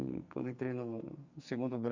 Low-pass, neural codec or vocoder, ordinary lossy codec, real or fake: 7.2 kHz; codec, 44.1 kHz, 2.6 kbps, SNAC; Opus, 64 kbps; fake